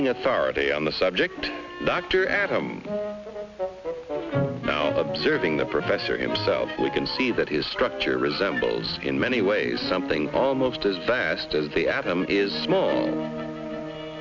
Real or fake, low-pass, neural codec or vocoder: real; 7.2 kHz; none